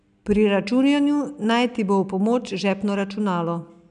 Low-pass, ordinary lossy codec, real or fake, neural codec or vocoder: 9.9 kHz; none; real; none